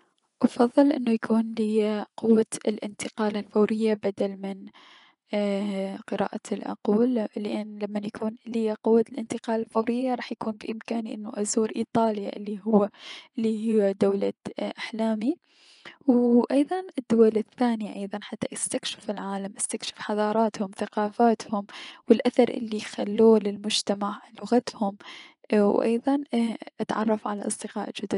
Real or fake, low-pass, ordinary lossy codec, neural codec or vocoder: fake; 10.8 kHz; none; vocoder, 24 kHz, 100 mel bands, Vocos